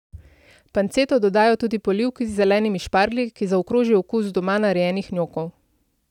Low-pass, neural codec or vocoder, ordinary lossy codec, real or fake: 19.8 kHz; none; none; real